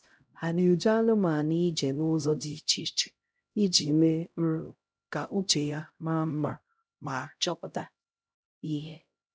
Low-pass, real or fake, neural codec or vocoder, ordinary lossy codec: none; fake; codec, 16 kHz, 0.5 kbps, X-Codec, HuBERT features, trained on LibriSpeech; none